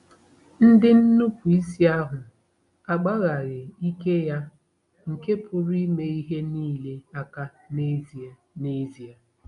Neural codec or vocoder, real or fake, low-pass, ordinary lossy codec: none; real; 10.8 kHz; none